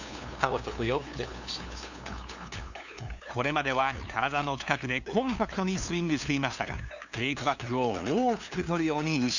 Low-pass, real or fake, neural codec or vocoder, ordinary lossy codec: 7.2 kHz; fake; codec, 16 kHz, 2 kbps, FunCodec, trained on LibriTTS, 25 frames a second; none